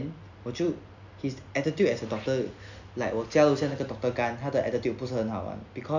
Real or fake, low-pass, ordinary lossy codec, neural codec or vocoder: real; 7.2 kHz; none; none